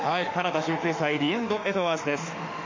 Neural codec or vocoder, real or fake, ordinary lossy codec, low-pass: autoencoder, 48 kHz, 32 numbers a frame, DAC-VAE, trained on Japanese speech; fake; MP3, 48 kbps; 7.2 kHz